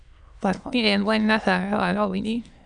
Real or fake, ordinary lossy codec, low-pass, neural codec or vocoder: fake; MP3, 96 kbps; 9.9 kHz; autoencoder, 22.05 kHz, a latent of 192 numbers a frame, VITS, trained on many speakers